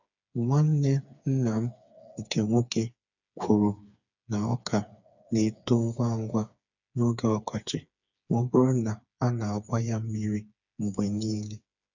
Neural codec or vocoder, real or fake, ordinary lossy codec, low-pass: codec, 16 kHz, 4 kbps, FreqCodec, smaller model; fake; none; 7.2 kHz